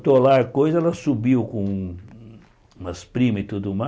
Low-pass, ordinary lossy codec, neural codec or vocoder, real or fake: none; none; none; real